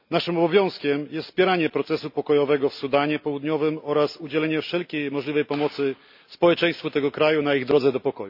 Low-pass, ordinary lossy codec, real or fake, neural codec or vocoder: 5.4 kHz; none; real; none